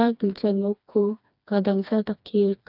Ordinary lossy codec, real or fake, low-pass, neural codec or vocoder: none; fake; 5.4 kHz; codec, 16 kHz, 2 kbps, FreqCodec, smaller model